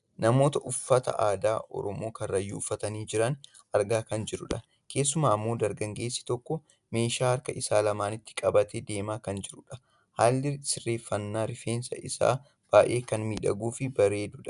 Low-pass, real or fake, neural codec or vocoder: 10.8 kHz; real; none